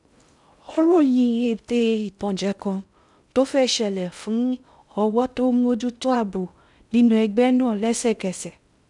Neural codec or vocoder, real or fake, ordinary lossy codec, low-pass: codec, 16 kHz in and 24 kHz out, 0.6 kbps, FocalCodec, streaming, 2048 codes; fake; none; 10.8 kHz